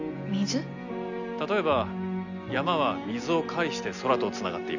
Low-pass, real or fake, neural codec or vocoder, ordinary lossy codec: 7.2 kHz; real; none; none